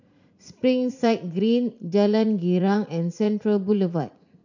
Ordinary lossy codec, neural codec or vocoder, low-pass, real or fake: none; vocoder, 44.1 kHz, 80 mel bands, Vocos; 7.2 kHz; fake